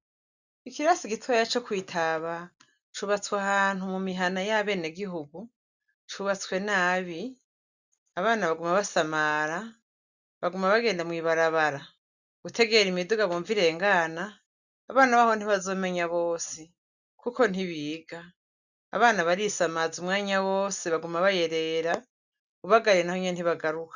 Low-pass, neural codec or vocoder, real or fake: 7.2 kHz; none; real